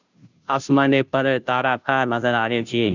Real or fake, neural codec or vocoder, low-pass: fake; codec, 16 kHz, 0.5 kbps, FunCodec, trained on Chinese and English, 25 frames a second; 7.2 kHz